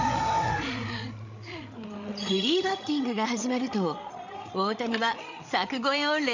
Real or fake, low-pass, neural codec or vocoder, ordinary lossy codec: fake; 7.2 kHz; codec, 16 kHz, 8 kbps, FreqCodec, larger model; none